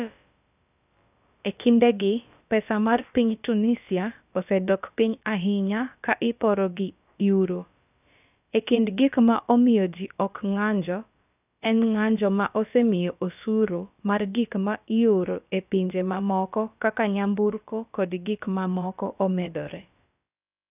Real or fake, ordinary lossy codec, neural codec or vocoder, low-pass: fake; none; codec, 16 kHz, about 1 kbps, DyCAST, with the encoder's durations; 3.6 kHz